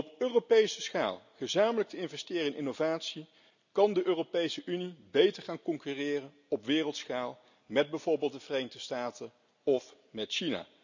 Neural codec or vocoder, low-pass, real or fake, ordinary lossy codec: none; 7.2 kHz; real; none